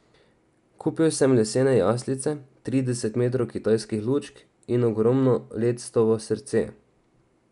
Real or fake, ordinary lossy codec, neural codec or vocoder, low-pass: real; none; none; 10.8 kHz